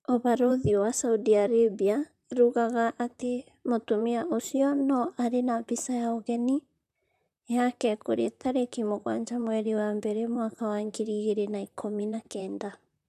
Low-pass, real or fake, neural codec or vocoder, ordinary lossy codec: 14.4 kHz; fake; vocoder, 44.1 kHz, 128 mel bands, Pupu-Vocoder; none